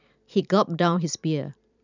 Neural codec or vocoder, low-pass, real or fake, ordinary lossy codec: vocoder, 44.1 kHz, 128 mel bands every 256 samples, BigVGAN v2; 7.2 kHz; fake; none